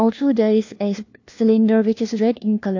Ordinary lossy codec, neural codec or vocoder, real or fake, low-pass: AAC, 48 kbps; codec, 16 kHz, 1 kbps, FunCodec, trained on LibriTTS, 50 frames a second; fake; 7.2 kHz